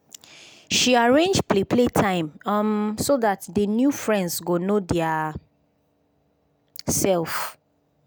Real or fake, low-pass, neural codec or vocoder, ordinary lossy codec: real; none; none; none